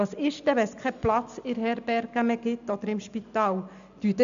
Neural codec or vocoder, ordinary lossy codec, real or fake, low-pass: none; none; real; 7.2 kHz